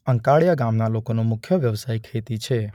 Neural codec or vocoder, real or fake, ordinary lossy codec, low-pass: none; real; none; 19.8 kHz